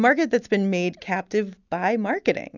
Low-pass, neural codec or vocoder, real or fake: 7.2 kHz; none; real